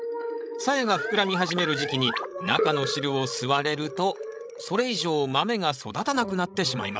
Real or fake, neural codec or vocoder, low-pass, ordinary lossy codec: fake; codec, 16 kHz, 16 kbps, FreqCodec, larger model; none; none